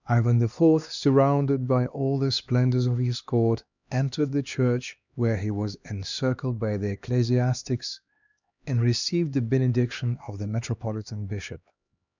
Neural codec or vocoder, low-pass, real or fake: codec, 16 kHz, 2 kbps, X-Codec, HuBERT features, trained on LibriSpeech; 7.2 kHz; fake